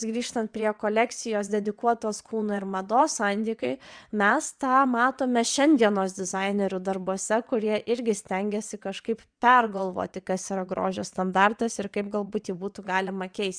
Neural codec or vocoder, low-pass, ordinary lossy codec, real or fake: vocoder, 22.05 kHz, 80 mel bands, WaveNeXt; 9.9 kHz; Opus, 64 kbps; fake